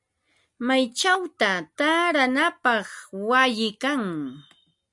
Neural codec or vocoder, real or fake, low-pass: none; real; 10.8 kHz